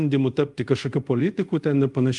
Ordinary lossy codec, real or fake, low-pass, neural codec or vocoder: Opus, 32 kbps; fake; 10.8 kHz; codec, 24 kHz, 0.5 kbps, DualCodec